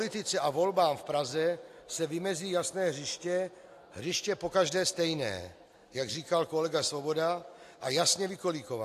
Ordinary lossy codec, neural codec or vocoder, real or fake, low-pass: AAC, 64 kbps; none; real; 14.4 kHz